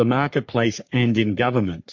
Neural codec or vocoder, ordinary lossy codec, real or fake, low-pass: codec, 44.1 kHz, 3.4 kbps, Pupu-Codec; MP3, 48 kbps; fake; 7.2 kHz